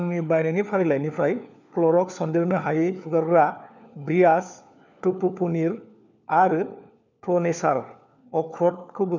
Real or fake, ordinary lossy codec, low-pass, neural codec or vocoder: fake; none; 7.2 kHz; codec, 16 kHz, 4 kbps, FunCodec, trained on LibriTTS, 50 frames a second